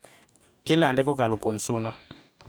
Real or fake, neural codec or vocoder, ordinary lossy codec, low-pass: fake; codec, 44.1 kHz, 2.6 kbps, DAC; none; none